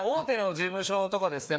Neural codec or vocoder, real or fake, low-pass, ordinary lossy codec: codec, 16 kHz, 2 kbps, FreqCodec, larger model; fake; none; none